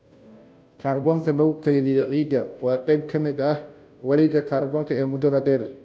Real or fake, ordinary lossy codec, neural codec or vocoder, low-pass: fake; none; codec, 16 kHz, 0.5 kbps, FunCodec, trained on Chinese and English, 25 frames a second; none